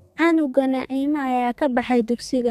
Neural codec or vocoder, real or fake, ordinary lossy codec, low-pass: codec, 32 kHz, 1.9 kbps, SNAC; fake; none; 14.4 kHz